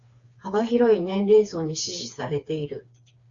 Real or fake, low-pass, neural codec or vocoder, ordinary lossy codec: fake; 7.2 kHz; codec, 16 kHz, 4 kbps, FreqCodec, smaller model; Opus, 64 kbps